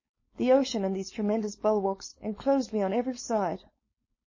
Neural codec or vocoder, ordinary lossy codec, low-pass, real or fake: codec, 16 kHz, 4.8 kbps, FACodec; MP3, 32 kbps; 7.2 kHz; fake